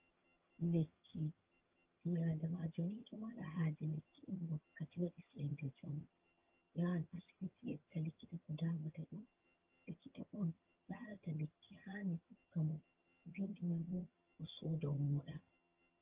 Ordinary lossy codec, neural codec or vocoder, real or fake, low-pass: Opus, 32 kbps; vocoder, 22.05 kHz, 80 mel bands, HiFi-GAN; fake; 3.6 kHz